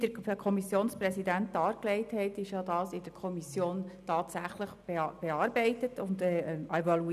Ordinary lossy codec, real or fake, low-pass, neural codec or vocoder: none; real; 14.4 kHz; none